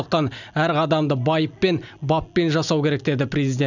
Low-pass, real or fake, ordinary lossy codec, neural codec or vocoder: 7.2 kHz; real; none; none